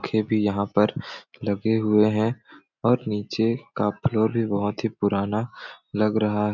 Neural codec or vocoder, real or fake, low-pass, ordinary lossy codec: none; real; 7.2 kHz; none